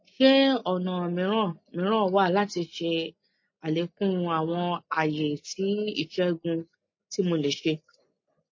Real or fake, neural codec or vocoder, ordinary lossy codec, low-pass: real; none; MP3, 32 kbps; 7.2 kHz